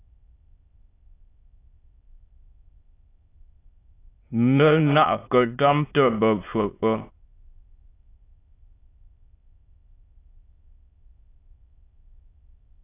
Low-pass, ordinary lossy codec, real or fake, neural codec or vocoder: 3.6 kHz; AAC, 24 kbps; fake; autoencoder, 22.05 kHz, a latent of 192 numbers a frame, VITS, trained on many speakers